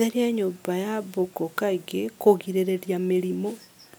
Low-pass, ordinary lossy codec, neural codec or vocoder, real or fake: none; none; none; real